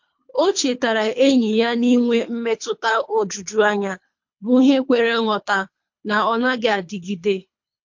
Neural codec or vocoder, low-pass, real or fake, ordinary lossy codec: codec, 24 kHz, 3 kbps, HILCodec; 7.2 kHz; fake; MP3, 48 kbps